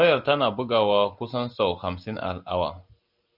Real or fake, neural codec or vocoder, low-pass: real; none; 5.4 kHz